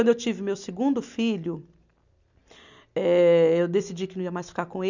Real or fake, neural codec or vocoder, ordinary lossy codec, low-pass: real; none; none; 7.2 kHz